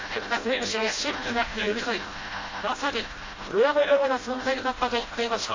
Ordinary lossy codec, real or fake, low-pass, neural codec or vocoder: AAC, 32 kbps; fake; 7.2 kHz; codec, 16 kHz, 0.5 kbps, FreqCodec, smaller model